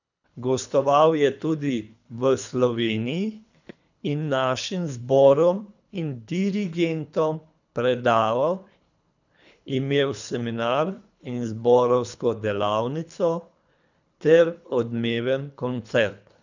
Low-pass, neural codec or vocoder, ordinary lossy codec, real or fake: 7.2 kHz; codec, 24 kHz, 3 kbps, HILCodec; none; fake